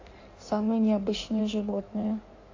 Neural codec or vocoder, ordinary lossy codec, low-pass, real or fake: codec, 16 kHz in and 24 kHz out, 1.1 kbps, FireRedTTS-2 codec; AAC, 32 kbps; 7.2 kHz; fake